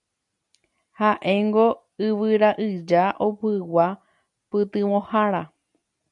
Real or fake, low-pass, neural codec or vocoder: real; 10.8 kHz; none